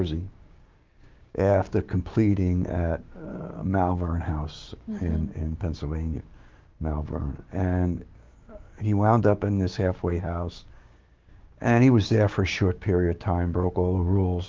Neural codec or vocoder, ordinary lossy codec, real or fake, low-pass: codec, 16 kHz, 6 kbps, DAC; Opus, 32 kbps; fake; 7.2 kHz